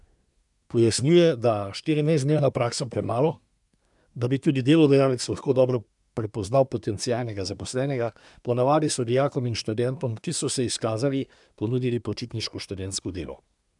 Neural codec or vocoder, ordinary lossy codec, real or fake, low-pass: codec, 24 kHz, 1 kbps, SNAC; none; fake; 10.8 kHz